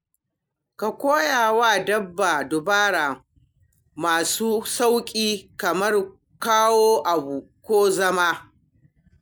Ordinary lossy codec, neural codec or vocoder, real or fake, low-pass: none; none; real; none